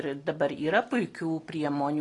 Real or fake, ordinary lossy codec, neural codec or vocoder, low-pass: real; AAC, 32 kbps; none; 10.8 kHz